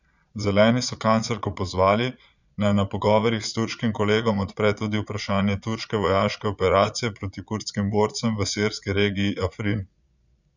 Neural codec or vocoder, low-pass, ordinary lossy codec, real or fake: vocoder, 22.05 kHz, 80 mel bands, Vocos; 7.2 kHz; none; fake